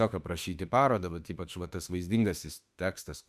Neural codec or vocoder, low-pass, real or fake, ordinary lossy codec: autoencoder, 48 kHz, 32 numbers a frame, DAC-VAE, trained on Japanese speech; 14.4 kHz; fake; Opus, 64 kbps